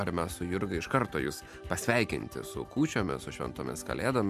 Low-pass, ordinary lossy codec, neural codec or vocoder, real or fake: 14.4 kHz; MP3, 96 kbps; none; real